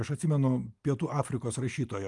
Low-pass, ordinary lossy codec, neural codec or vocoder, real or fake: 10.8 kHz; Opus, 32 kbps; none; real